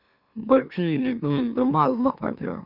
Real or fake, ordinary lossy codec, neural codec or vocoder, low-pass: fake; Opus, 64 kbps; autoencoder, 44.1 kHz, a latent of 192 numbers a frame, MeloTTS; 5.4 kHz